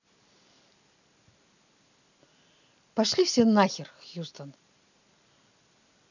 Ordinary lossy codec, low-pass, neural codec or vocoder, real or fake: none; 7.2 kHz; none; real